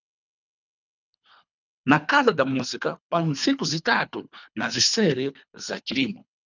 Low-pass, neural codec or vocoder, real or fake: 7.2 kHz; codec, 24 kHz, 3 kbps, HILCodec; fake